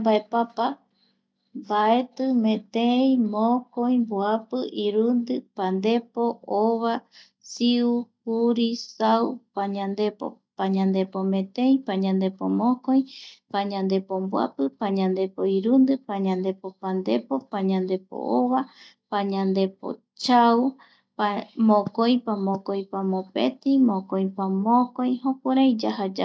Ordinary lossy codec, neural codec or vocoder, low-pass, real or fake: none; none; none; real